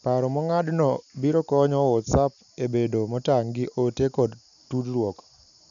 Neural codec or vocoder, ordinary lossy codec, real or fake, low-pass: none; none; real; 7.2 kHz